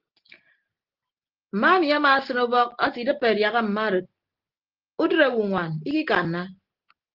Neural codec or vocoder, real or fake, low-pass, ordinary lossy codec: none; real; 5.4 kHz; Opus, 16 kbps